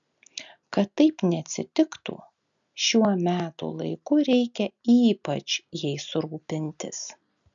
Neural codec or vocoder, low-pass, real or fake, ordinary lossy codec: none; 7.2 kHz; real; MP3, 96 kbps